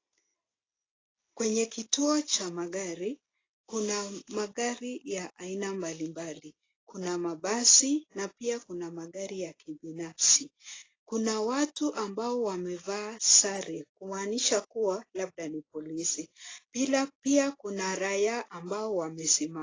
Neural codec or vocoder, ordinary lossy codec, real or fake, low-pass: none; AAC, 32 kbps; real; 7.2 kHz